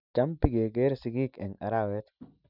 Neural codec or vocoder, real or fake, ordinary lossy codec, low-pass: none; real; none; 5.4 kHz